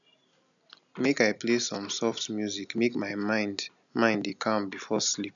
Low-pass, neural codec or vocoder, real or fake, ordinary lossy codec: 7.2 kHz; none; real; none